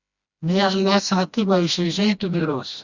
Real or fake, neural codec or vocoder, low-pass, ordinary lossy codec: fake; codec, 16 kHz, 1 kbps, FreqCodec, smaller model; 7.2 kHz; Opus, 64 kbps